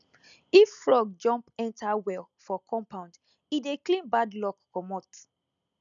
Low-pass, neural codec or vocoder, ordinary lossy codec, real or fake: 7.2 kHz; none; none; real